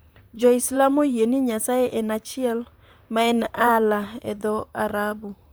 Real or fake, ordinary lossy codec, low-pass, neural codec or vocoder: fake; none; none; vocoder, 44.1 kHz, 128 mel bands, Pupu-Vocoder